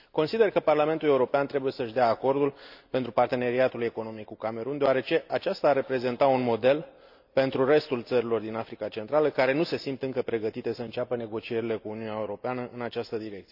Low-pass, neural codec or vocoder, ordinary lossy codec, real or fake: 5.4 kHz; none; none; real